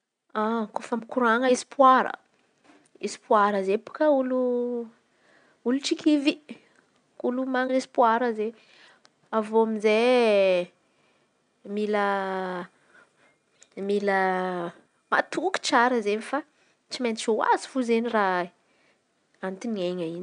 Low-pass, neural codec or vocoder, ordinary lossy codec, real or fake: 9.9 kHz; none; none; real